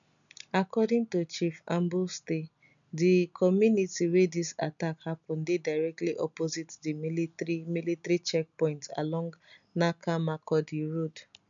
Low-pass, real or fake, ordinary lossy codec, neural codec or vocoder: 7.2 kHz; real; none; none